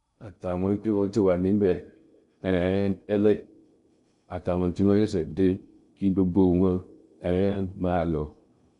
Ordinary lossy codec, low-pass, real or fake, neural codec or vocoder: none; 10.8 kHz; fake; codec, 16 kHz in and 24 kHz out, 0.6 kbps, FocalCodec, streaming, 2048 codes